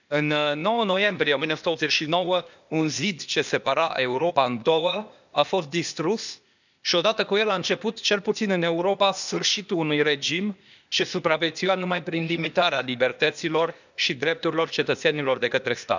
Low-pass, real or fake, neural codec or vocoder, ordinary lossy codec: 7.2 kHz; fake; codec, 16 kHz, 0.8 kbps, ZipCodec; none